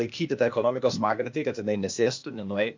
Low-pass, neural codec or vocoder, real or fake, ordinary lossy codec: 7.2 kHz; codec, 16 kHz, 0.8 kbps, ZipCodec; fake; MP3, 48 kbps